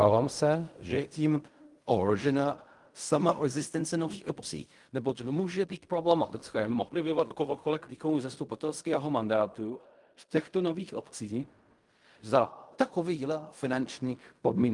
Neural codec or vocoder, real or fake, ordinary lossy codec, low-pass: codec, 16 kHz in and 24 kHz out, 0.4 kbps, LongCat-Audio-Codec, fine tuned four codebook decoder; fake; Opus, 32 kbps; 10.8 kHz